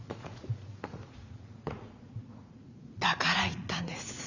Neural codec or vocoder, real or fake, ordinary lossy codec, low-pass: vocoder, 44.1 kHz, 80 mel bands, Vocos; fake; Opus, 64 kbps; 7.2 kHz